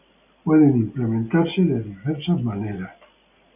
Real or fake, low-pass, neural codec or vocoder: real; 3.6 kHz; none